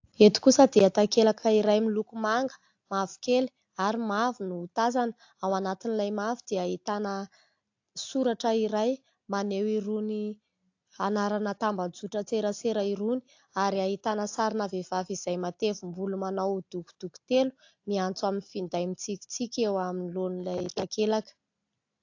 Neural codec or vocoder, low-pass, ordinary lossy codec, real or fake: none; 7.2 kHz; AAC, 48 kbps; real